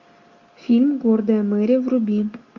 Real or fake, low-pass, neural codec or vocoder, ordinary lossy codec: real; 7.2 kHz; none; MP3, 48 kbps